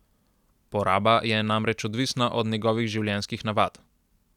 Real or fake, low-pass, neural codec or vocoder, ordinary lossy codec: real; 19.8 kHz; none; none